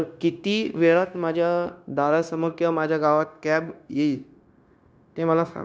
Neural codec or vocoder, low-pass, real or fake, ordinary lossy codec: codec, 16 kHz, 0.9 kbps, LongCat-Audio-Codec; none; fake; none